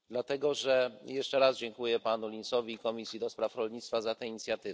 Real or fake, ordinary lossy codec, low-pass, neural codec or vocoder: real; none; none; none